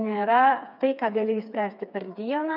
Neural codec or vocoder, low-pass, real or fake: codec, 16 kHz, 4 kbps, FreqCodec, smaller model; 5.4 kHz; fake